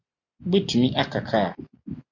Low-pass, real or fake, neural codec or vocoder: 7.2 kHz; real; none